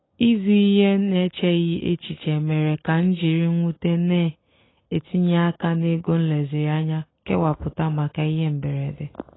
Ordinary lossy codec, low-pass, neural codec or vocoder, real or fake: AAC, 16 kbps; 7.2 kHz; none; real